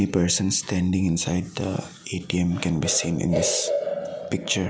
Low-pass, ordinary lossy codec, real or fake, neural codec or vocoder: none; none; real; none